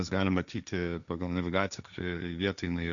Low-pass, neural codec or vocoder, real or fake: 7.2 kHz; codec, 16 kHz, 1.1 kbps, Voila-Tokenizer; fake